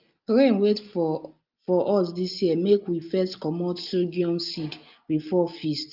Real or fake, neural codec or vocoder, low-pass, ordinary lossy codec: real; none; 5.4 kHz; Opus, 24 kbps